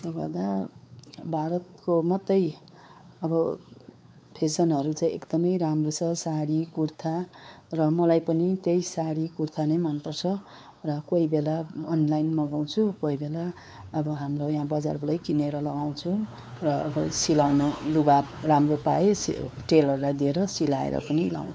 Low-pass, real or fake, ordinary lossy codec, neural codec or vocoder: none; fake; none; codec, 16 kHz, 4 kbps, X-Codec, WavLM features, trained on Multilingual LibriSpeech